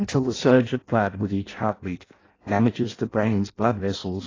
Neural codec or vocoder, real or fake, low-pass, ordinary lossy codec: codec, 16 kHz in and 24 kHz out, 0.6 kbps, FireRedTTS-2 codec; fake; 7.2 kHz; AAC, 32 kbps